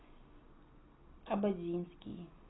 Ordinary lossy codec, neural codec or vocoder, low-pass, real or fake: AAC, 16 kbps; none; 7.2 kHz; real